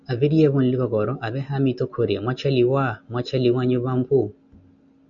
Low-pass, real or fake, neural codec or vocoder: 7.2 kHz; real; none